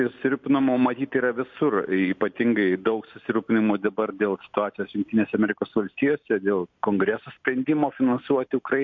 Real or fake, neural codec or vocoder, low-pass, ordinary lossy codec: real; none; 7.2 kHz; MP3, 48 kbps